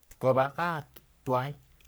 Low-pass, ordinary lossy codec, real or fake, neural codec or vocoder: none; none; fake; codec, 44.1 kHz, 3.4 kbps, Pupu-Codec